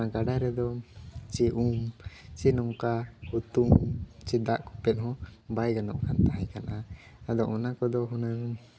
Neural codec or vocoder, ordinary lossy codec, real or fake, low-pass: none; none; real; none